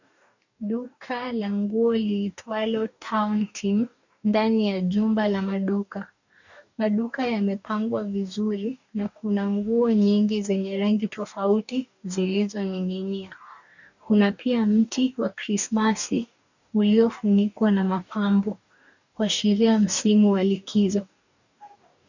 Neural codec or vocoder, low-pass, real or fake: codec, 44.1 kHz, 2.6 kbps, DAC; 7.2 kHz; fake